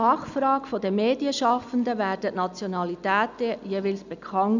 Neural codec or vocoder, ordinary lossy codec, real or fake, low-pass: none; none; real; 7.2 kHz